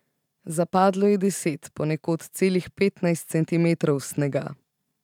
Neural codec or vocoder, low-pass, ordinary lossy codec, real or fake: vocoder, 44.1 kHz, 128 mel bands every 512 samples, BigVGAN v2; 19.8 kHz; none; fake